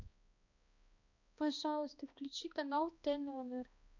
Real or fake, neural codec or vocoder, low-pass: fake; codec, 16 kHz, 1 kbps, X-Codec, HuBERT features, trained on balanced general audio; 7.2 kHz